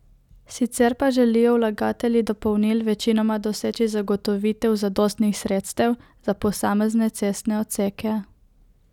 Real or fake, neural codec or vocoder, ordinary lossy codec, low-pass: real; none; none; 19.8 kHz